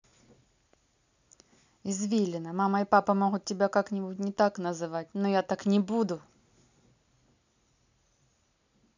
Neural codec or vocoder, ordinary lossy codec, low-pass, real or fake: none; none; 7.2 kHz; real